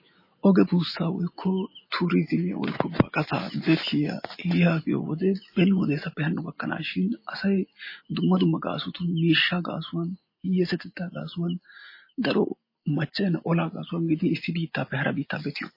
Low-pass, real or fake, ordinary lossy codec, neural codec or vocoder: 5.4 kHz; real; MP3, 24 kbps; none